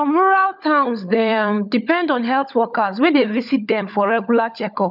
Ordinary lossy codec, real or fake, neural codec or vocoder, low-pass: none; fake; codec, 16 kHz, 16 kbps, FunCodec, trained on LibriTTS, 50 frames a second; 5.4 kHz